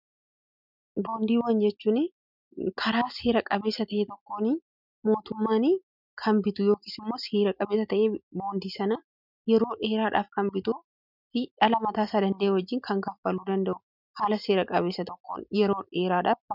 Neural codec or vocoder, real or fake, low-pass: none; real; 5.4 kHz